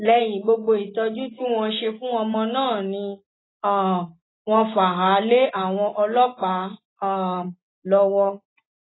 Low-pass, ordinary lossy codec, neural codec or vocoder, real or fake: 7.2 kHz; AAC, 16 kbps; none; real